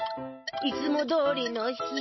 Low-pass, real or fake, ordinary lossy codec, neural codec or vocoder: 7.2 kHz; real; MP3, 24 kbps; none